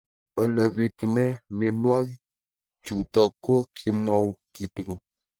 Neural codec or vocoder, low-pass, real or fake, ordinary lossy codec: codec, 44.1 kHz, 1.7 kbps, Pupu-Codec; none; fake; none